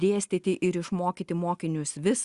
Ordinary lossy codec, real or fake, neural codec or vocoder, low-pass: Opus, 64 kbps; real; none; 10.8 kHz